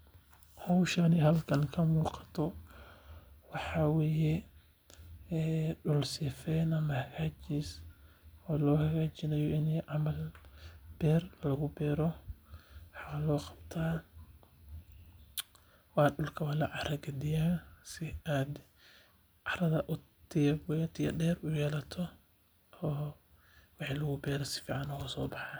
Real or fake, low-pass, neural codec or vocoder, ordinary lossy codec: real; none; none; none